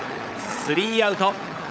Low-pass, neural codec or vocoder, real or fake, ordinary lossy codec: none; codec, 16 kHz, 16 kbps, FunCodec, trained on LibriTTS, 50 frames a second; fake; none